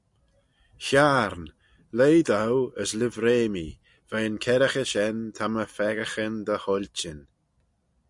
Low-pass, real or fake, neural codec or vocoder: 10.8 kHz; real; none